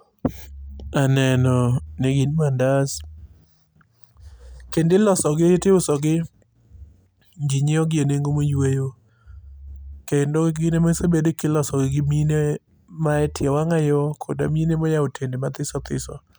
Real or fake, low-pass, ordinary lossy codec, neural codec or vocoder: real; none; none; none